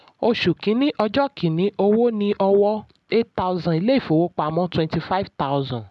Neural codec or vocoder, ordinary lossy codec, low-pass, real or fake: none; none; none; real